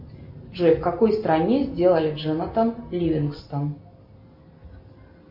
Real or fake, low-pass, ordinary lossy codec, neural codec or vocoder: real; 5.4 kHz; MP3, 32 kbps; none